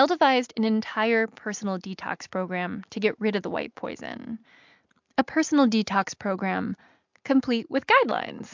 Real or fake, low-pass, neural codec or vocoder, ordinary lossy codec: real; 7.2 kHz; none; MP3, 64 kbps